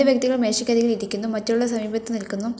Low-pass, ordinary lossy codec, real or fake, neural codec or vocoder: none; none; real; none